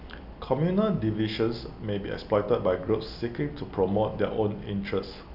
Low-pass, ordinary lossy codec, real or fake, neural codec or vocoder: 5.4 kHz; none; real; none